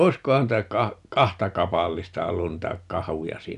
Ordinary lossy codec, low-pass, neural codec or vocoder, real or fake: none; 10.8 kHz; none; real